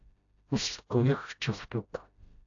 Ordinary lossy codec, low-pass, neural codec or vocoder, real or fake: AAC, 64 kbps; 7.2 kHz; codec, 16 kHz, 0.5 kbps, FreqCodec, smaller model; fake